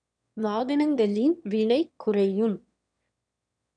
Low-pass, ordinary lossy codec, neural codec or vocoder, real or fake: 9.9 kHz; none; autoencoder, 22.05 kHz, a latent of 192 numbers a frame, VITS, trained on one speaker; fake